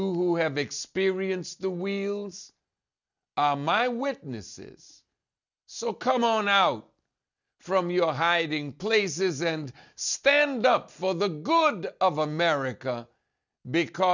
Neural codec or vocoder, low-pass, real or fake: none; 7.2 kHz; real